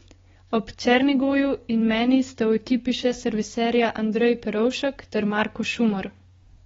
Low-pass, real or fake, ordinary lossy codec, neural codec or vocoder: 7.2 kHz; fake; AAC, 24 kbps; codec, 16 kHz, 6 kbps, DAC